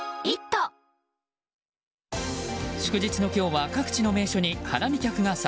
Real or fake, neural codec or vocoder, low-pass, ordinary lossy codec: real; none; none; none